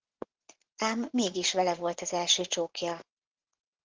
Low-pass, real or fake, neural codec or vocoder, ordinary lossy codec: 7.2 kHz; fake; vocoder, 44.1 kHz, 80 mel bands, Vocos; Opus, 16 kbps